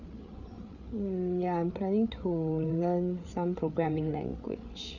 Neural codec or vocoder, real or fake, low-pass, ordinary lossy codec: codec, 16 kHz, 8 kbps, FreqCodec, larger model; fake; 7.2 kHz; none